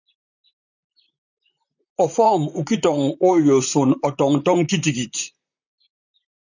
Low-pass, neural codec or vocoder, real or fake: 7.2 kHz; vocoder, 44.1 kHz, 128 mel bands, Pupu-Vocoder; fake